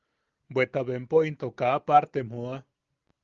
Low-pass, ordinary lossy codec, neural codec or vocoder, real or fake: 7.2 kHz; Opus, 32 kbps; none; real